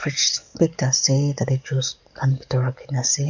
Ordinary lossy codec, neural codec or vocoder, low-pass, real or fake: none; codec, 44.1 kHz, 7.8 kbps, DAC; 7.2 kHz; fake